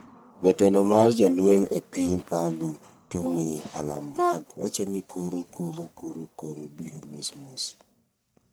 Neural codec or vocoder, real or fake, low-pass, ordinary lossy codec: codec, 44.1 kHz, 1.7 kbps, Pupu-Codec; fake; none; none